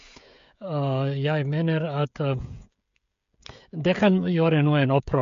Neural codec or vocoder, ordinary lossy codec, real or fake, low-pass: codec, 16 kHz, 16 kbps, FreqCodec, smaller model; MP3, 48 kbps; fake; 7.2 kHz